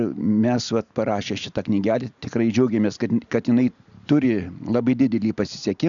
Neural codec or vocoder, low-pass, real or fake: none; 7.2 kHz; real